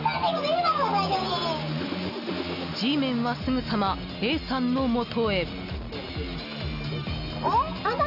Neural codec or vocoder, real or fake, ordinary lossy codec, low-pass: none; real; none; 5.4 kHz